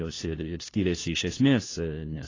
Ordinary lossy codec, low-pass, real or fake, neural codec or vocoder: AAC, 32 kbps; 7.2 kHz; fake; codec, 16 kHz, 1 kbps, FunCodec, trained on LibriTTS, 50 frames a second